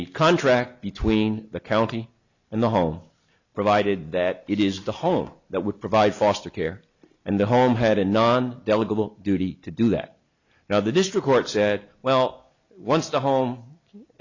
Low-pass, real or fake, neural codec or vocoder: 7.2 kHz; real; none